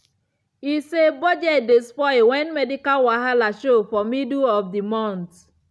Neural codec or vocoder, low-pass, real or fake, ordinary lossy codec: none; none; real; none